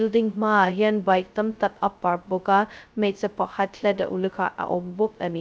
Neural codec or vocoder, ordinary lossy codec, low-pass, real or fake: codec, 16 kHz, 0.2 kbps, FocalCodec; none; none; fake